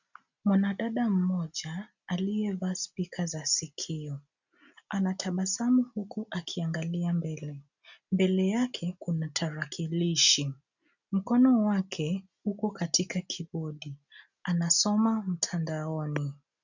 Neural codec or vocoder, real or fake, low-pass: none; real; 7.2 kHz